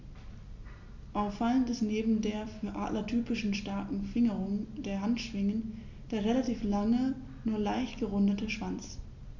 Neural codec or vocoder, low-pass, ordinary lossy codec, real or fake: none; 7.2 kHz; none; real